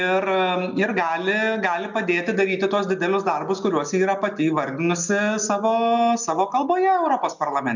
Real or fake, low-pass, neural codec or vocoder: real; 7.2 kHz; none